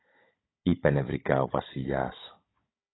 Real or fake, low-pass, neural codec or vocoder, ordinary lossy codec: fake; 7.2 kHz; codec, 16 kHz, 16 kbps, FunCodec, trained on Chinese and English, 50 frames a second; AAC, 16 kbps